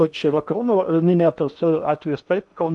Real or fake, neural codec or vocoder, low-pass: fake; codec, 16 kHz in and 24 kHz out, 0.8 kbps, FocalCodec, streaming, 65536 codes; 10.8 kHz